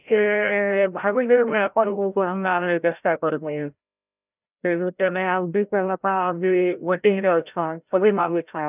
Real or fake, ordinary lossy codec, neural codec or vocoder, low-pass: fake; none; codec, 16 kHz, 0.5 kbps, FreqCodec, larger model; 3.6 kHz